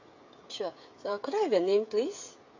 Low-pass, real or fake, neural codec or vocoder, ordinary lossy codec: 7.2 kHz; fake; codec, 16 kHz, 16 kbps, FreqCodec, smaller model; AAC, 48 kbps